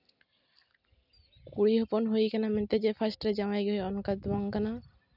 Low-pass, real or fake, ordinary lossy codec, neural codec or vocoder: 5.4 kHz; real; none; none